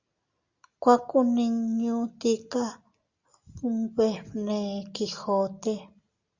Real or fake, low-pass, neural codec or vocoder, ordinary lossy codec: real; 7.2 kHz; none; Opus, 64 kbps